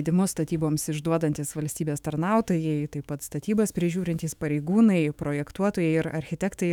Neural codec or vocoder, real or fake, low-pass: autoencoder, 48 kHz, 128 numbers a frame, DAC-VAE, trained on Japanese speech; fake; 19.8 kHz